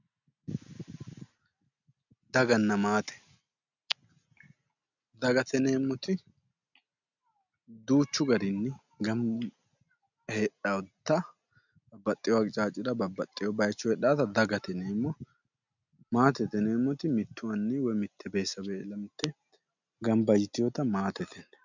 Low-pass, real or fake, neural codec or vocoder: 7.2 kHz; real; none